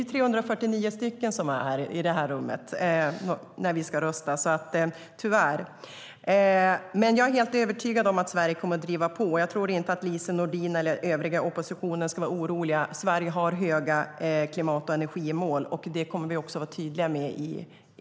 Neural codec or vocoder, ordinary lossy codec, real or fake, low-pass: none; none; real; none